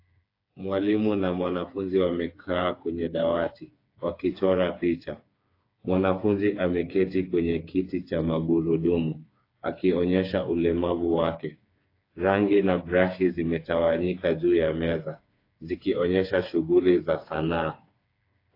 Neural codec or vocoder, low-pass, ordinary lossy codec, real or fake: codec, 16 kHz, 4 kbps, FreqCodec, smaller model; 5.4 kHz; AAC, 32 kbps; fake